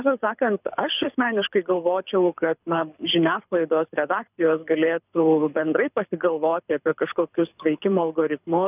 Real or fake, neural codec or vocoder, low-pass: fake; codec, 24 kHz, 6 kbps, HILCodec; 3.6 kHz